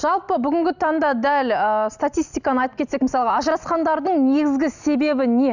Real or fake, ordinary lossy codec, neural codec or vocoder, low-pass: real; none; none; 7.2 kHz